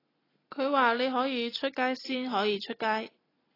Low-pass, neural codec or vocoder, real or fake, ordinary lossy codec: 5.4 kHz; none; real; AAC, 24 kbps